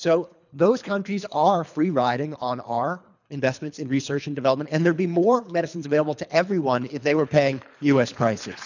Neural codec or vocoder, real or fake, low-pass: codec, 24 kHz, 3 kbps, HILCodec; fake; 7.2 kHz